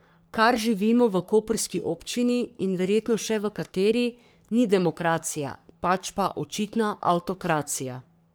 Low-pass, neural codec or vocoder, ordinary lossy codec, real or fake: none; codec, 44.1 kHz, 3.4 kbps, Pupu-Codec; none; fake